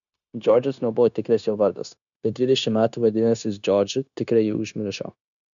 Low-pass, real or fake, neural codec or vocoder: 7.2 kHz; fake; codec, 16 kHz, 0.9 kbps, LongCat-Audio-Codec